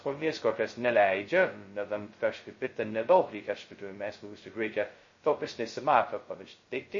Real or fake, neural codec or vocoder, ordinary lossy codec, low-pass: fake; codec, 16 kHz, 0.2 kbps, FocalCodec; MP3, 32 kbps; 7.2 kHz